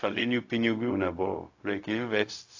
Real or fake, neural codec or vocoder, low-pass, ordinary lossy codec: fake; codec, 16 kHz, 0.4 kbps, LongCat-Audio-Codec; 7.2 kHz; AAC, 48 kbps